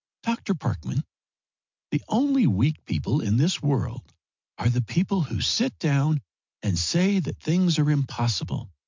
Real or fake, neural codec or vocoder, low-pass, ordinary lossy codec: real; none; 7.2 kHz; MP3, 64 kbps